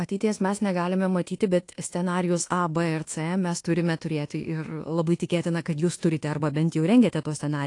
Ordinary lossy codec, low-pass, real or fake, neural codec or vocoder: AAC, 48 kbps; 10.8 kHz; fake; codec, 24 kHz, 1.2 kbps, DualCodec